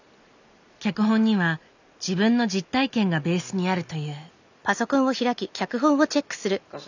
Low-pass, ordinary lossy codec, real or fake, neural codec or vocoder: 7.2 kHz; none; real; none